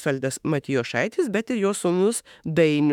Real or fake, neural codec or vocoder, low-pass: fake; autoencoder, 48 kHz, 32 numbers a frame, DAC-VAE, trained on Japanese speech; 19.8 kHz